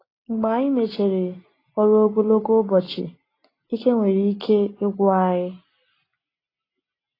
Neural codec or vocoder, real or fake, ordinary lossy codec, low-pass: none; real; AAC, 24 kbps; 5.4 kHz